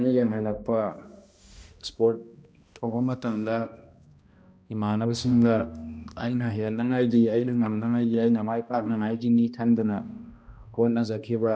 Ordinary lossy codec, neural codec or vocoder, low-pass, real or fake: none; codec, 16 kHz, 1 kbps, X-Codec, HuBERT features, trained on balanced general audio; none; fake